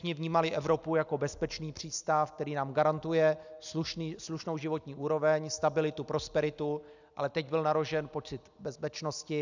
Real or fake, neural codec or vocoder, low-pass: real; none; 7.2 kHz